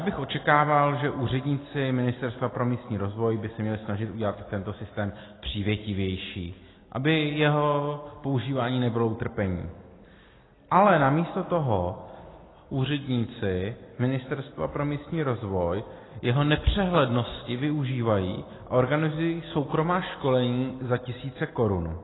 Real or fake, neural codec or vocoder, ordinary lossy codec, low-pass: real; none; AAC, 16 kbps; 7.2 kHz